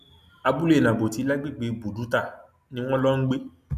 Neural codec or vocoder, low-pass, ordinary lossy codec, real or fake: none; 14.4 kHz; none; real